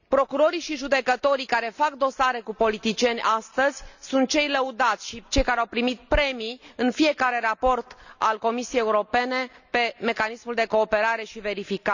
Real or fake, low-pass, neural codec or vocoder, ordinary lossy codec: real; 7.2 kHz; none; none